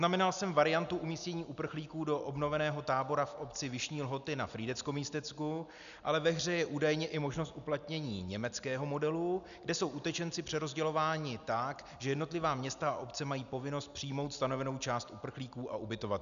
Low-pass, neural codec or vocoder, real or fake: 7.2 kHz; none; real